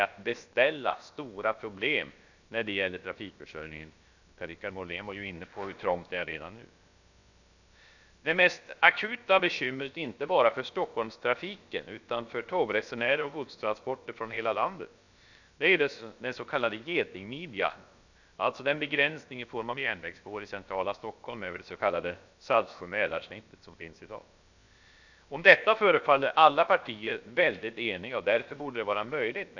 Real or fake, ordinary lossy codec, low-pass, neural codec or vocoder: fake; none; 7.2 kHz; codec, 16 kHz, about 1 kbps, DyCAST, with the encoder's durations